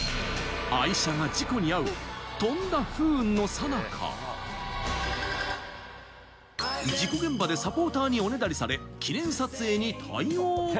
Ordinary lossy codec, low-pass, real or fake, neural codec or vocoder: none; none; real; none